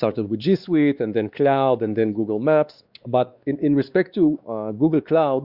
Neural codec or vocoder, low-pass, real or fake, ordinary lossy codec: codec, 16 kHz, 2 kbps, X-Codec, WavLM features, trained on Multilingual LibriSpeech; 5.4 kHz; fake; Opus, 64 kbps